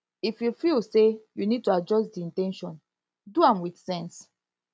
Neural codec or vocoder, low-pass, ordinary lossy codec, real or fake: none; none; none; real